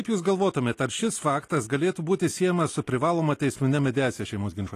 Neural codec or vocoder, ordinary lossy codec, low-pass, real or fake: none; AAC, 48 kbps; 14.4 kHz; real